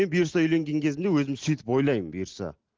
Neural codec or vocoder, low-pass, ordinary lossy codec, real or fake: none; 7.2 kHz; Opus, 16 kbps; real